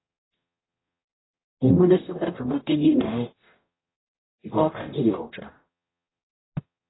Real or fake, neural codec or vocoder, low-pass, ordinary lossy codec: fake; codec, 44.1 kHz, 0.9 kbps, DAC; 7.2 kHz; AAC, 16 kbps